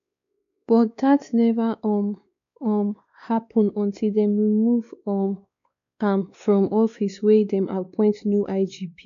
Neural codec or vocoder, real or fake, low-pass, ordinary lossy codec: codec, 16 kHz, 2 kbps, X-Codec, WavLM features, trained on Multilingual LibriSpeech; fake; 7.2 kHz; none